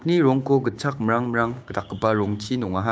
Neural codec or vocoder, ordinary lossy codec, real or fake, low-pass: codec, 16 kHz, 6 kbps, DAC; none; fake; none